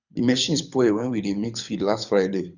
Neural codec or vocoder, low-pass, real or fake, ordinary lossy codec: codec, 24 kHz, 6 kbps, HILCodec; 7.2 kHz; fake; none